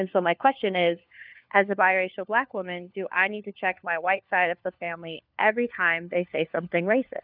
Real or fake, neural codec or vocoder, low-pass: fake; codec, 16 kHz, 4 kbps, FunCodec, trained on LibriTTS, 50 frames a second; 5.4 kHz